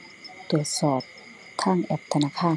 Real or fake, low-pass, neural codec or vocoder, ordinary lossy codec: real; none; none; none